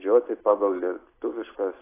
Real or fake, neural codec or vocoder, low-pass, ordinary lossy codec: real; none; 3.6 kHz; AAC, 16 kbps